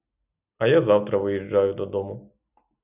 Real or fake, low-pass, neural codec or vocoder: real; 3.6 kHz; none